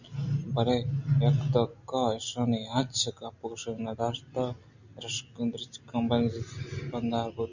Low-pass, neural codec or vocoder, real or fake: 7.2 kHz; none; real